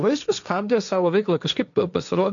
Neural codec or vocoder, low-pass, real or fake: codec, 16 kHz, 1.1 kbps, Voila-Tokenizer; 7.2 kHz; fake